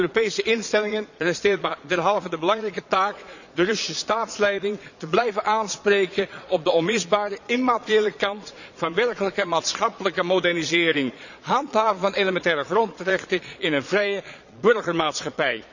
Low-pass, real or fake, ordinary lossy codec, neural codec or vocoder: 7.2 kHz; fake; none; vocoder, 22.05 kHz, 80 mel bands, Vocos